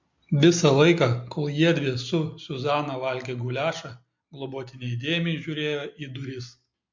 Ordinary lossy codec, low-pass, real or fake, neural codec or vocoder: MP3, 48 kbps; 7.2 kHz; real; none